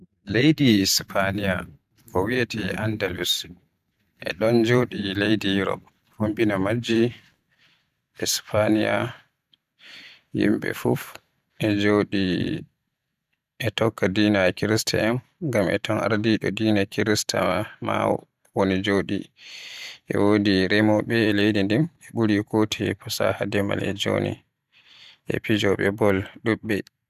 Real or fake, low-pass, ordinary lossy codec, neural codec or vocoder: fake; 14.4 kHz; none; vocoder, 44.1 kHz, 128 mel bands every 512 samples, BigVGAN v2